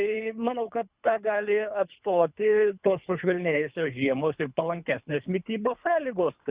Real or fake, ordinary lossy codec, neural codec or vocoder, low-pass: fake; Opus, 64 kbps; codec, 24 kHz, 3 kbps, HILCodec; 3.6 kHz